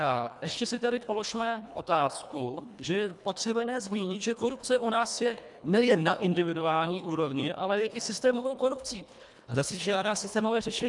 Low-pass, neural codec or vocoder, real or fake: 10.8 kHz; codec, 24 kHz, 1.5 kbps, HILCodec; fake